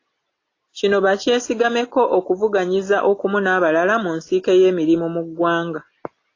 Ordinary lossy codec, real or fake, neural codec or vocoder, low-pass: AAC, 32 kbps; real; none; 7.2 kHz